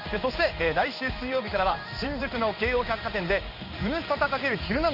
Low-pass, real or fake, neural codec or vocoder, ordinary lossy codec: 5.4 kHz; fake; codec, 16 kHz in and 24 kHz out, 1 kbps, XY-Tokenizer; MP3, 48 kbps